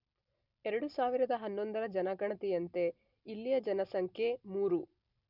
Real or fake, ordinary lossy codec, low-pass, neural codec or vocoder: real; none; 5.4 kHz; none